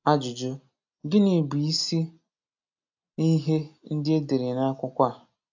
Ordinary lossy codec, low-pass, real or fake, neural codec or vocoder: AAC, 48 kbps; 7.2 kHz; real; none